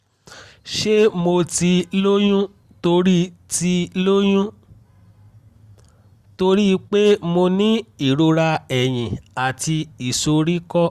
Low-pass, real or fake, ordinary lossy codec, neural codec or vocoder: 14.4 kHz; real; Opus, 64 kbps; none